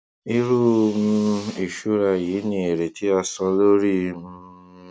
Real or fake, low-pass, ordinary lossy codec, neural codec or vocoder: real; none; none; none